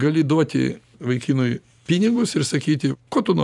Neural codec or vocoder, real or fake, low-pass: vocoder, 44.1 kHz, 128 mel bands every 512 samples, BigVGAN v2; fake; 10.8 kHz